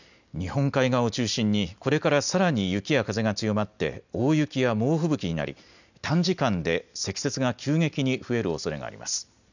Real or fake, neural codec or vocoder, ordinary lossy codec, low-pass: real; none; none; 7.2 kHz